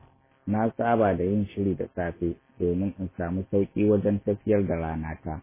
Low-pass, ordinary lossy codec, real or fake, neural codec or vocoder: 3.6 kHz; MP3, 16 kbps; real; none